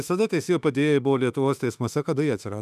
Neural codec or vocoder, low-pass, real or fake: autoencoder, 48 kHz, 32 numbers a frame, DAC-VAE, trained on Japanese speech; 14.4 kHz; fake